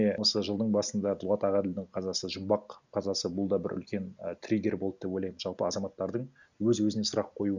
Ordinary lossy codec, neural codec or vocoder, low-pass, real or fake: none; none; 7.2 kHz; real